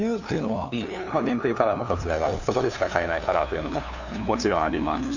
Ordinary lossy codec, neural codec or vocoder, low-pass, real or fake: none; codec, 16 kHz, 2 kbps, FunCodec, trained on LibriTTS, 25 frames a second; 7.2 kHz; fake